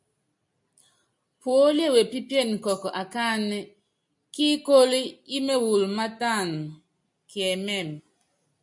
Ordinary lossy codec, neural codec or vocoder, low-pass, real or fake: MP3, 64 kbps; none; 10.8 kHz; real